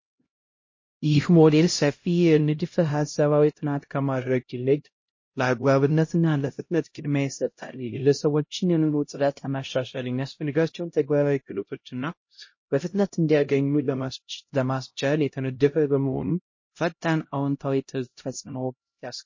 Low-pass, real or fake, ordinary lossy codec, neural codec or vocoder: 7.2 kHz; fake; MP3, 32 kbps; codec, 16 kHz, 0.5 kbps, X-Codec, HuBERT features, trained on LibriSpeech